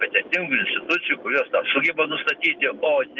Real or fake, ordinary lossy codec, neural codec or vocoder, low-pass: real; Opus, 32 kbps; none; 7.2 kHz